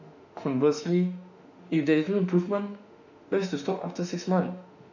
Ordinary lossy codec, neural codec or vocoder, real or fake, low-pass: none; autoencoder, 48 kHz, 32 numbers a frame, DAC-VAE, trained on Japanese speech; fake; 7.2 kHz